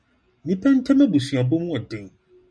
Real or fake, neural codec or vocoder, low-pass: real; none; 9.9 kHz